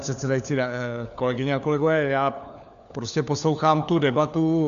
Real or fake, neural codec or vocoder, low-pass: fake; codec, 16 kHz, 4 kbps, FunCodec, trained on LibriTTS, 50 frames a second; 7.2 kHz